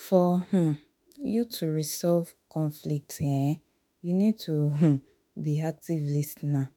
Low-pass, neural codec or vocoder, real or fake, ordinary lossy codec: none; autoencoder, 48 kHz, 32 numbers a frame, DAC-VAE, trained on Japanese speech; fake; none